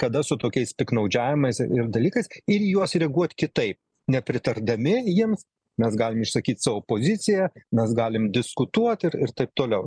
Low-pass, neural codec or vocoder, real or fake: 9.9 kHz; none; real